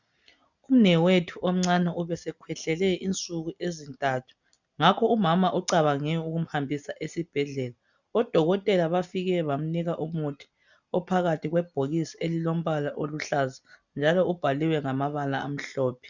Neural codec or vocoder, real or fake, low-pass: none; real; 7.2 kHz